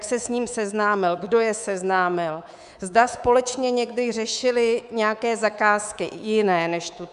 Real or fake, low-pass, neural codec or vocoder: fake; 10.8 kHz; codec, 24 kHz, 3.1 kbps, DualCodec